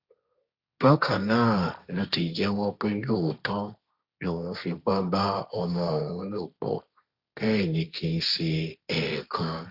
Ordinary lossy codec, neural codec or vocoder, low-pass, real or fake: Opus, 64 kbps; codec, 16 kHz, 1.1 kbps, Voila-Tokenizer; 5.4 kHz; fake